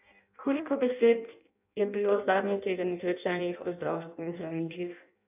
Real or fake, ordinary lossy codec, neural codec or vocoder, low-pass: fake; none; codec, 16 kHz in and 24 kHz out, 0.6 kbps, FireRedTTS-2 codec; 3.6 kHz